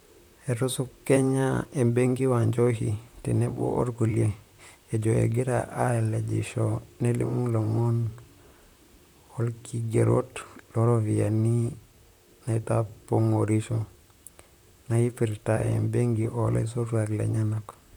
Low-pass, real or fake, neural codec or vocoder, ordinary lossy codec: none; fake; vocoder, 44.1 kHz, 128 mel bands, Pupu-Vocoder; none